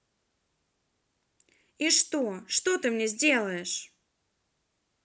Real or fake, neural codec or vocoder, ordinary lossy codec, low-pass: real; none; none; none